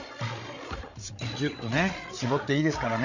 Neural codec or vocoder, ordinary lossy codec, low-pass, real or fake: codec, 16 kHz, 8 kbps, FreqCodec, larger model; none; 7.2 kHz; fake